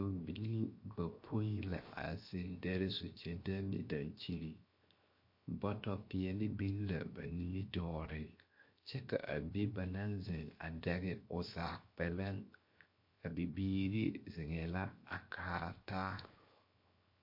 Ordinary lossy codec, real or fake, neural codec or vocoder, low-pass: MP3, 32 kbps; fake; codec, 16 kHz, 0.7 kbps, FocalCodec; 5.4 kHz